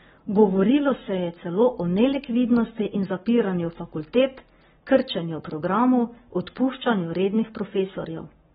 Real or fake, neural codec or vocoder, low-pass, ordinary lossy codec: fake; codec, 44.1 kHz, 7.8 kbps, Pupu-Codec; 19.8 kHz; AAC, 16 kbps